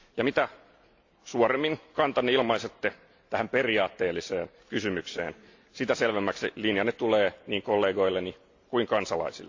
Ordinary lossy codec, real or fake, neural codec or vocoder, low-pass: AAC, 48 kbps; real; none; 7.2 kHz